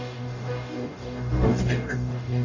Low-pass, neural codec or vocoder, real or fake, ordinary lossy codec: 7.2 kHz; codec, 44.1 kHz, 0.9 kbps, DAC; fake; none